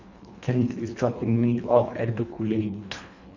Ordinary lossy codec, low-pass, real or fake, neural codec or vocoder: none; 7.2 kHz; fake; codec, 24 kHz, 1.5 kbps, HILCodec